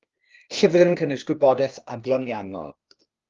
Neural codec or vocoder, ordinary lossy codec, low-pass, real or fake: codec, 16 kHz, 0.8 kbps, ZipCodec; Opus, 32 kbps; 7.2 kHz; fake